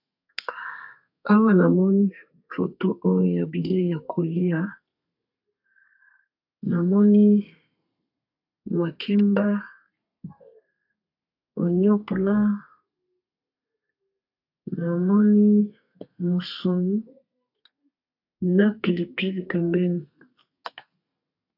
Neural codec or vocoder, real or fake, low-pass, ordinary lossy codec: codec, 32 kHz, 1.9 kbps, SNAC; fake; 5.4 kHz; AAC, 48 kbps